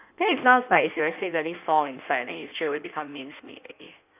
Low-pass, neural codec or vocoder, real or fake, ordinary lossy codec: 3.6 kHz; codec, 16 kHz in and 24 kHz out, 1.1 kbps, FireRedTTS-2 codec; fake; none